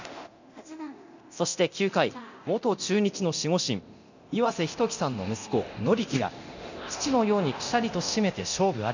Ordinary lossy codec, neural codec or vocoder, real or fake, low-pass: none; codec, 24 kHz, 0.9 kbps, DualCodec; fake; 7.2 kHz